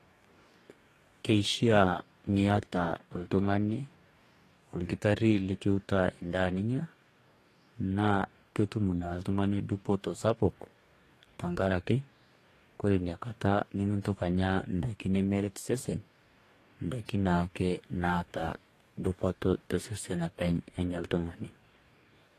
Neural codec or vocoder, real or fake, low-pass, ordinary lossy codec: codec, 44.1 kHz, 2.6 kbps, DAC; fake; 14.4 kHz; AAC, 48 kbps